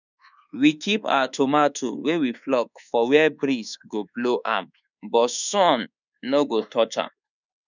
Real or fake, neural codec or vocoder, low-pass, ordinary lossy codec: fake; codec, 24 kHz, 1.2 kbps, DualCodec; 7.2 kHz; none